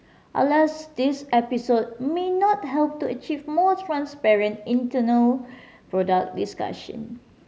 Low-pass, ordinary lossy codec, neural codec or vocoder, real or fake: none; none; none; real